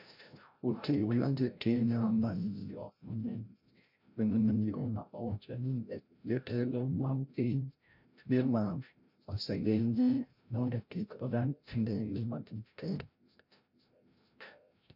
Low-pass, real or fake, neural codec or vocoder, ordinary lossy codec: 5.4 kHz; fake; codec, 16 kHz, 0.5 kbps, FreqCodec, larger model; MP3, 48 kbps